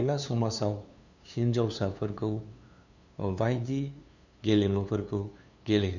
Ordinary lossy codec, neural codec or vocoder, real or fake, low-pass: none; codec, 16 kHz, 2 kbps, FunCodec, trained on LibriTTS, 25 frames a second; fake; 7.2 kHz